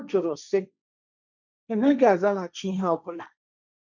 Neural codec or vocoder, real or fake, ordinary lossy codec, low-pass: codec, 16 kHz, 1.1 kbps, Voila-Tokenizer; fake; none; 7.2 kHz